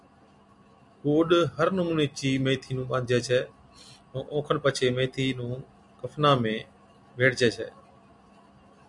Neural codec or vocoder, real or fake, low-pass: none; real; 10.8 kHz